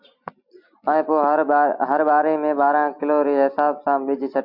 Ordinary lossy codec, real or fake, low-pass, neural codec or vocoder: MP3, 48 kbps; real; 5.4 kHz; none